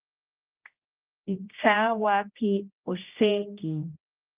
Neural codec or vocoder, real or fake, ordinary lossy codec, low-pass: codec, 16 kHz, 1 kbps, X-Codec, HuBERT features, trained on general audio; fake; Opus, 24 kbps; 3.6 kHz